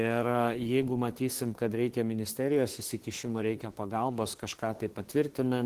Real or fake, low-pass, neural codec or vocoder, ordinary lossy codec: fake; 14.4 kHz; autoencoder, 48 kHz, 32 numbers a frame, DAC-VAE, trained on Japanese speech; Opus, 16 kbps